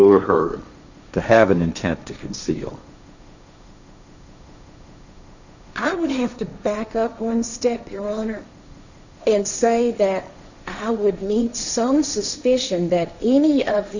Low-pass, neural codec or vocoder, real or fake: 7.2 kHz; codec, 16 kHz, 1.1 kbps, Voila-Tokenizer; fake